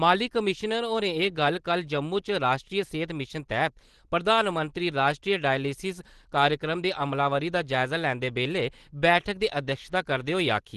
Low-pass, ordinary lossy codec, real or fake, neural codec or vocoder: 10.8 kHz; Opus, 16 kbps; real; none